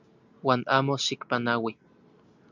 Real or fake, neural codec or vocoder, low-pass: real; none; 7.2 kHz